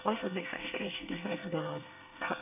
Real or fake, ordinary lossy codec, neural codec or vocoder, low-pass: fake; none; codec, 24 kHz, 1 kbps, SNAC; 3.6 kHz